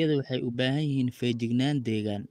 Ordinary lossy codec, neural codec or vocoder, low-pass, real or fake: Opus, 24 kbps; none; 14.4 kHz; real